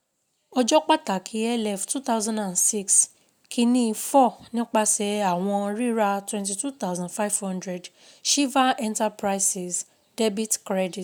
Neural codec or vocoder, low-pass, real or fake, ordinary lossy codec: none; none; real; none